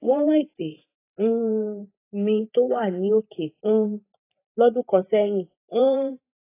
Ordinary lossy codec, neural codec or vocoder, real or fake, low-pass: AAC, 24 kbps; vocoder, 44.1 kHz, 128 mel bands every 512 samples, BigVGAN v2; fake; 3.6 kHz